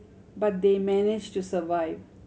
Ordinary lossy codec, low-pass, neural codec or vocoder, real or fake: none; none; none; real